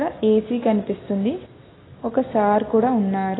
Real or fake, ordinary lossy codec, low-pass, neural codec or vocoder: real; AAC, 16 kbps; 7.2 kHz; none